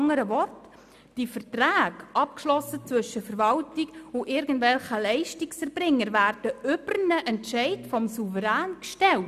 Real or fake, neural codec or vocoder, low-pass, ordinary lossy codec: real; none; 14.4 kHz; none